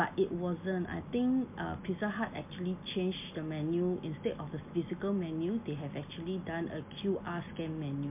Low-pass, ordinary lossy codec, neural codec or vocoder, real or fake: 3.6 kHz; MP3, 32 kbps; none; real